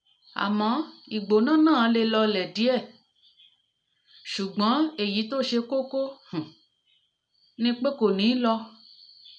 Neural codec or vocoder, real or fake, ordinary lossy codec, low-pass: none; real; none; 9.9 kHz